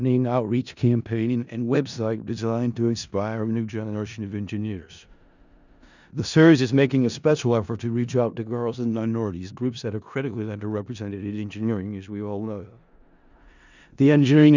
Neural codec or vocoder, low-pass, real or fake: codec, 16 kHz in and 24 kHz out, 0.4 kbps, LongCat-Audio-Codec, four codebook decoder; 7.2 kHz; fake